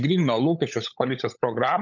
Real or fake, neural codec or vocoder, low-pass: fake; codec, 16 kHz, 16 kbps, FunCodec, trained on LibriTTS, 50 frames a second; 7.2 kHz